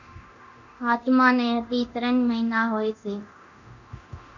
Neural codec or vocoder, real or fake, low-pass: codec, 24 kHz, 0.9 kbps, DualCodec; fake; 7.2 kHz